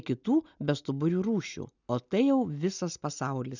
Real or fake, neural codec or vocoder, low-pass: real; none; 7.2 kHz